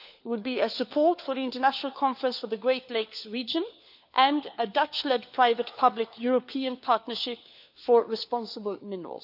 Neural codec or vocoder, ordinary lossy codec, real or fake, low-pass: codec, 16 kHz, 4 kbps, FunCodec, trained on LibriTTS, 50 frames a second; none; fake; 5.4 kHz